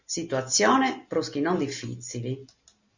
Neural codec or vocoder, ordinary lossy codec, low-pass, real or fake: none; Opus, 64 kbps; 7.2 kHz; real